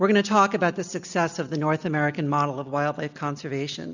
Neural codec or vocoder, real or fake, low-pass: none; real; 7.2 kHz